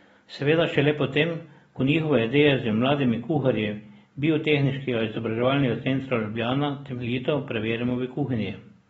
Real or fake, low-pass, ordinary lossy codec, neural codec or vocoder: real; 19.8 kHz; AAC, 24 kbps; none